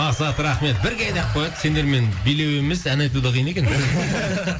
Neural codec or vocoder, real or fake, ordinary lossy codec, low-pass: none; real; none; none